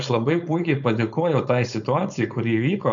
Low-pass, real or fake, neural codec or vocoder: 7.2 kHz; fake; codec, 16 kHz, 4.8 kbps, FACodec